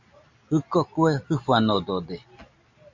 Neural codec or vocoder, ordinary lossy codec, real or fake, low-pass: none; AAC, 48 kbps; real; 7.2 kHz